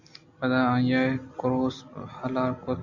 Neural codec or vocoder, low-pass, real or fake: none; 7.2 kHz; real